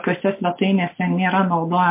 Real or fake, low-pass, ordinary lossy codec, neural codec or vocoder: real; 3.6 kHz; MP3, 32 kbps; none